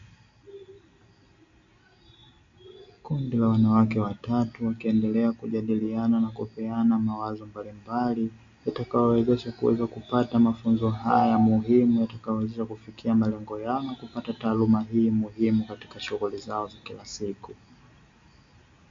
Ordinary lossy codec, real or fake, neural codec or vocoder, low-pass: MP3, 48 kbps; real; none; 7.2 kHz